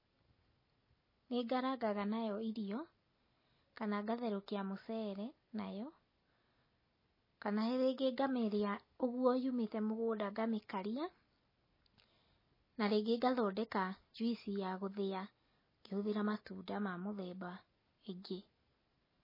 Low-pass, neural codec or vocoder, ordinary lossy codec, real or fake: 5.4 kHz; none; MP3, 24 kbps; real